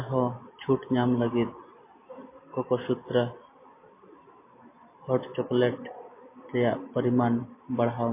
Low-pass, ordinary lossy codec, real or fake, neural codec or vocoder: 3.6 kHz; MP3, 24 kbps; real; none